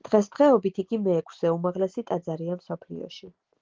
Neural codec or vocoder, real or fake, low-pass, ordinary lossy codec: none; real; 7.2 kHz; Opus, 16 kbps